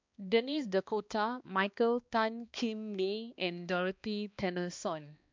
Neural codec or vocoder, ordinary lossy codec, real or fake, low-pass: codec, 16 kHz, 2 kbps, X-Codec, HuBERT features, trained on balanced general audio; MP3, 64 kbps; fake; 7.2 kHz